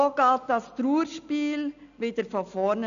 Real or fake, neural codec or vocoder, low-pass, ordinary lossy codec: real; none; 7.2 kHz; none